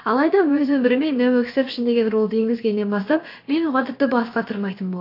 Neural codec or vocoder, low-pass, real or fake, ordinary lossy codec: codec, 16 kHz, about 1 kbps, DyCAST, with the encoder's durations; 5.4 kHz; fake; AAC, 32 kbps